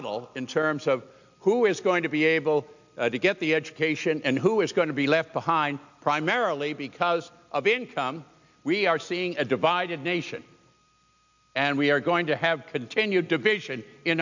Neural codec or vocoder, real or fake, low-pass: none; real; 7.2 kHz